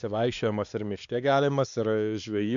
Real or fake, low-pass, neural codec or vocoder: fake; 7.2 kHz; codec, 16 kHz, 2 kbps, X-Codec, WavLM features, trained on Multilingual LibriSpeech